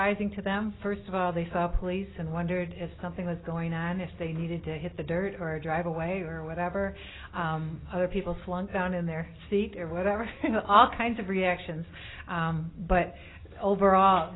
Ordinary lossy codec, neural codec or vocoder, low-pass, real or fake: AAC, 16 kbps; none; 7.2 kHz; real